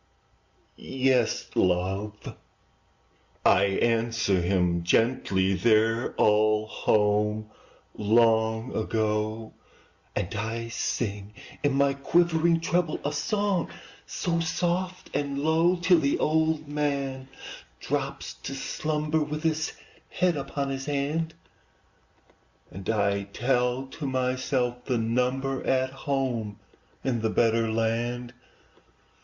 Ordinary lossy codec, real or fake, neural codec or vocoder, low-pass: Opus, 64 kbps; real; none; 7.2 kHz